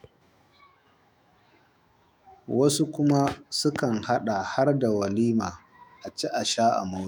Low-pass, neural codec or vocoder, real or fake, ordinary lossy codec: none; autoencoder, 48 kHz, 128 numbers a frame, DAC-VAE, trained on Japanese speech; fake; none